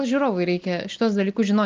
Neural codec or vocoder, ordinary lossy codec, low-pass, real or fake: none; Opus, 24 kbps; 7.2 kHz; real